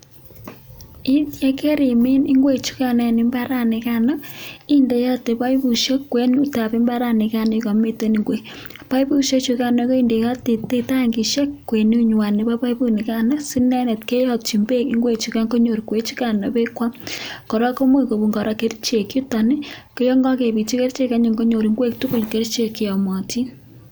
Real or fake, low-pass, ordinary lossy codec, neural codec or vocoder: real; none; none; none